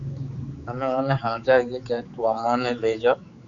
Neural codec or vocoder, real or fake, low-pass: codec, 16 kHz, 4 kbps, X-Codec, HuBERT features, trained on general audio; fake; 7.2 kHz